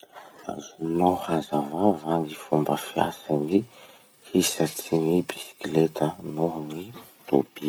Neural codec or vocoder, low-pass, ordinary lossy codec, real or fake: none; none; none; real